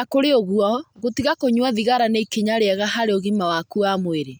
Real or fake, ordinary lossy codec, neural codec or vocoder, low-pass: real; none; none; none